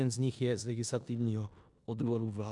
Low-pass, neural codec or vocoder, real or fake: 10.8 kHz; codec, 16 kHz in and 24 kHz out, 0.9 kbps, LongCat-Audio-Codec, four codebook decoder; fake